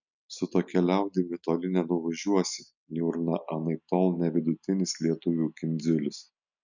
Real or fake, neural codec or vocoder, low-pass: real; none; 7.2 kHz